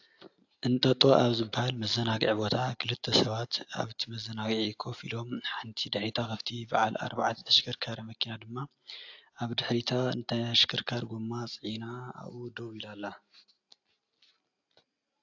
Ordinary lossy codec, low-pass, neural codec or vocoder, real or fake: AAC, 48 kbps; 7.2 kHz; none; real